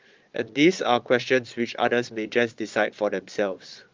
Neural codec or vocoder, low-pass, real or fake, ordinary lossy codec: vocoder, 22.05 kHz, 80 mel bands, Vocos; 7.2 kHz; fake; Opus, 24 kbps